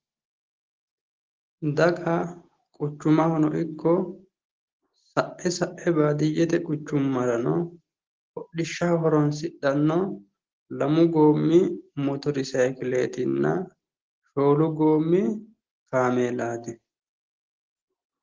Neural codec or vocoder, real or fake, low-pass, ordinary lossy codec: none; real; 7.2 kHz; Opus, 16 kbps